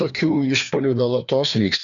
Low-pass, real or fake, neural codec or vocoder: 7.2 kHz; fake; codec, 16 kHz, 2 kbps, FreqCodec, larger model